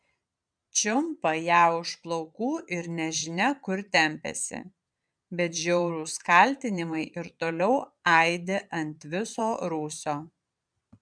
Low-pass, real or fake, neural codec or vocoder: 9.9 kHz; fake; vocoder, 22.05 kHz, 80 mel bands, Vocos